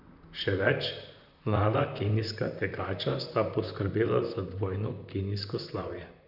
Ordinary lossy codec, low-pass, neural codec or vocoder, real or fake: none; 5.4 kHz; vocoder, 44.1 kHz, 128 mel bands, Pupu-Vocoder; fake